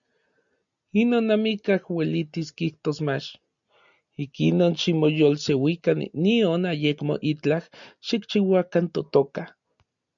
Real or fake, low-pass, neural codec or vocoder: real; 7.2 kHz; none